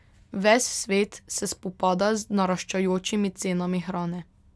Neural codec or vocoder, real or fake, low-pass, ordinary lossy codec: none; real; none; none